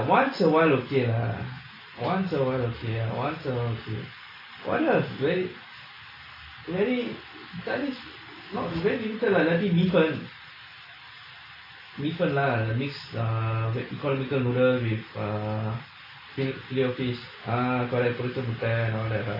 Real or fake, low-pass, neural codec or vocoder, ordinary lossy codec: real; 5.4 kHz; none; AAC, 24 kbps